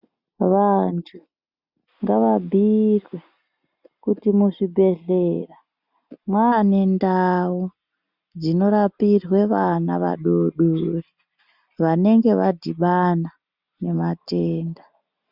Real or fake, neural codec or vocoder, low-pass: real; none; 5.4 kHz